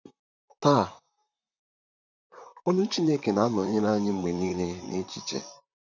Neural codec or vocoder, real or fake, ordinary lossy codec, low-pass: vocoder, 44.1 kHz, 128 mel bands, Pupu-Vocoder; fake; none; 7.2 kHz